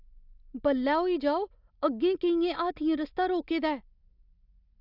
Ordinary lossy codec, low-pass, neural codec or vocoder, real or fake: none; 5.4 kHz; none; real